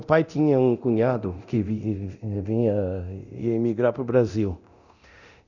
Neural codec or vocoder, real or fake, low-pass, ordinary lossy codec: codec, 24 kHz, 0.9 kbps, DualCodec; fake; 7.2 kHz; none